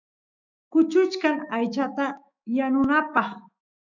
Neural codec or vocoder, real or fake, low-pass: autoencoder, 48 kHz, 128 numbers a frame, DAC-VAE, trained on Japanese speech; fake; 7.2 kHz